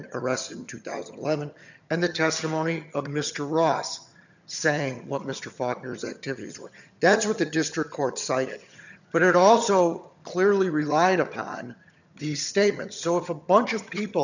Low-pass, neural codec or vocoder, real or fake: 7.2 kHz; vocoder, 22.05 kHz, 80 mel bands, HiFi-GAN; fake